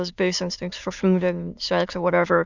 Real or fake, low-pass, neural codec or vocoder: fake; 7.2 kHz; autoencoder, 22.05 kHz, a latent of 192 numbers a frame, VITS, trained on many speakers